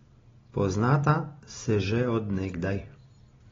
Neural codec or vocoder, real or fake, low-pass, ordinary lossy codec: none; real; 7.2 kHz; AAC, 24 kbps